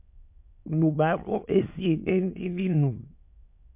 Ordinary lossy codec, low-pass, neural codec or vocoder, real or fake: MP3, 32 kbps; 3.6 kHz; autoencoder, 22.05 kHz, a latent of 192 numbers a frame, VITS, trained on many speakers; fake